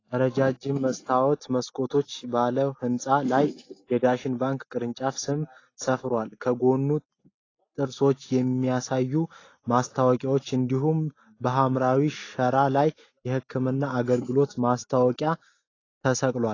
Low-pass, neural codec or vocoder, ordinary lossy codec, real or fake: 7.2 kHz; none; AAC, 32 kbps; real